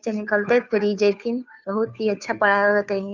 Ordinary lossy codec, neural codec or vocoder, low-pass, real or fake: none; codec, 16 kHz, 2 kbps, FunCodec, trained on Chinese and English, 25 frames a second; 7.2 kHz; fake